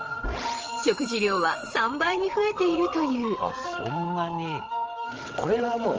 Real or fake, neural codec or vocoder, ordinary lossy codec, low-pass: fake; codec, 16 kHz, 8 kbps, FreqCodec, larger model; Opus, 24 kbps; 7.2 kHz